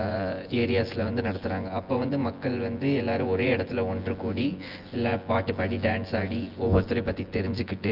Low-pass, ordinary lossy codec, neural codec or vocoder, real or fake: 5.4 kHz; Opus, 32 kbps; vocoder, 24 kHz, 100 mel bands, Vocos; fake